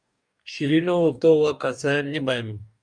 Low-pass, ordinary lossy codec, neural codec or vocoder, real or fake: 9.9 kHz; MP3, 96 kbps; codec, 44.1 kHz, 2.6 kbps, DAC; fake